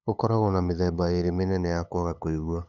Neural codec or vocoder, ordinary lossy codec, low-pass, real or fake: codec, 16 kHz, 8 kbps, FunCodec, trained on LibriTTS, 25 frames a second; none; 7.2 kHz; fake